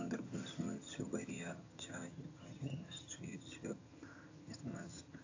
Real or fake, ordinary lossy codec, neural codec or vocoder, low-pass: fake; AAC, 48 kbps; vocoder, 22.05 kHz, 80 mel bands, HiFi-GAN; 7.2 kHz